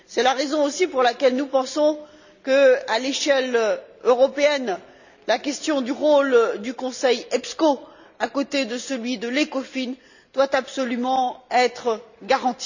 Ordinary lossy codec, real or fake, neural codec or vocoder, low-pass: none; real; none; 7.2 kHz